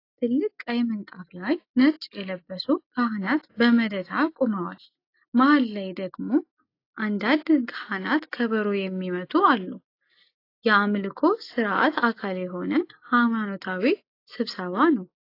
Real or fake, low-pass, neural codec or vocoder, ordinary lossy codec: real; 5.4 kHz; none; AAC, 32 kbps